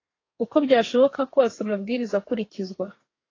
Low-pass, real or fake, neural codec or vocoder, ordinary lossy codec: 7.2 kHz; fake; codec, 32 kHz, 1.9 kbps, SNAC; AAC, 32 kbps